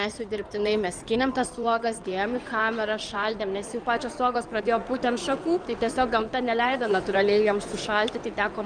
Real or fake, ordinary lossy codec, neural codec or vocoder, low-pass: fake; Opus, 32 kbps; codec, 16 kHz in and 24 kHz out, 2.2 kbps, FireRedTTS-2 codec; 9.9 kHz